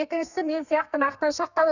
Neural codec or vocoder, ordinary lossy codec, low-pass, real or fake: codec, 32 kHz, 1.9 kbps, SNAC; none; 7.2 kHz; fake